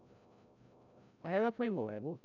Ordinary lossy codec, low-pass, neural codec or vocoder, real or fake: none; 7.2 kHz; codec, 16 kHz, 0.5 kbps, FreqCodec, larger model; fake